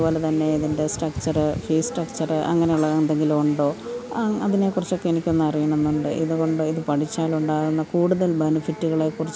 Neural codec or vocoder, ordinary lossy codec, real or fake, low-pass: none; none; real; none